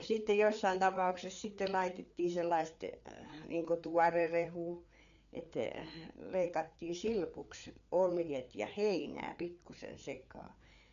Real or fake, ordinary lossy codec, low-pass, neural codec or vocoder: fake; none; 7.2 kHz; codec, 16 kHz, 4 kbps, FreqCodec, larger model